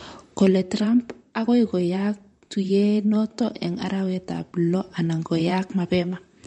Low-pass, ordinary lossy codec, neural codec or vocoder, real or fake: 19.8 kHz; MP3, 48 kbps; vocoder, 44.1 kHz, 128 mel bands, Pupu-Vocoder; fake